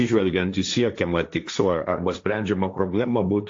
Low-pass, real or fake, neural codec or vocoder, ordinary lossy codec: 7.2 kHz; fake; codec, 16 kHz, 1.1 kbps, Voila-Tokenizer; AAC, 48 kbps